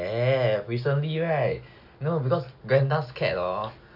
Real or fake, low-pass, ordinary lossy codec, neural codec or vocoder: real; 5.4 kHz; none; none